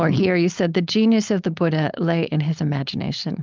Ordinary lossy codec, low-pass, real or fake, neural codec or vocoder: Opus, 24 kbps; 7.2 kHz; fake; codec, 16 kHz, 4.8 kbps, FACodec